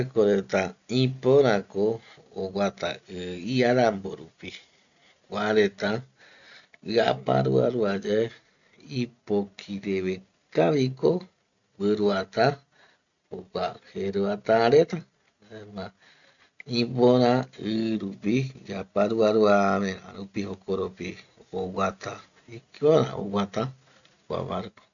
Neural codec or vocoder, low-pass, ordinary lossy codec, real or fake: none; 7.2 kHz; none; real